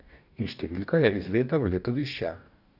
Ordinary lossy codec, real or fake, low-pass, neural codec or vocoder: none; fake; 5.4 kHz; codec, 44.1 kHz, 2.6 kbps, DAC